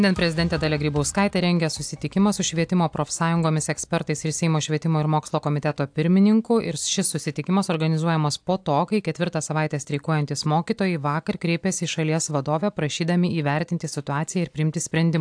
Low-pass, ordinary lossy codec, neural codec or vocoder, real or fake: 9.9 kHz; AAC, 64 kbps; none; real